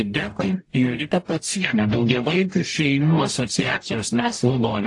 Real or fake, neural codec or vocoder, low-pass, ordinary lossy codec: fake; codec, 44.1 kHz, 0.9 kbps, DAC; 10.8 kHz; AAC, 48 kbps